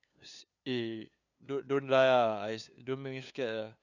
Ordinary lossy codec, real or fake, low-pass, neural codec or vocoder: none; fake; 7.2 kHz; codec, 16 kHz, 2 kbps, FunCodec, trained on LibriTTS, 25 frames a second